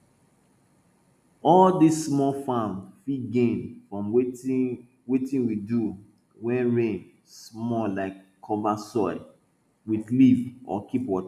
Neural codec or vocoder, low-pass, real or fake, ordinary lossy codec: none; 14.4 kHz; real; none